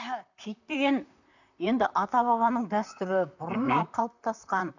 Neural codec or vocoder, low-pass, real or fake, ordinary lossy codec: vocoder, 44.1 kHz, 128 mel bands, Pupu-Vocoder; 7.2 kHz; fake; none